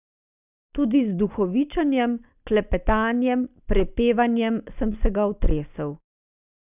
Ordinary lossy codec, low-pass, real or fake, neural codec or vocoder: none; 3.6 kHz; real; none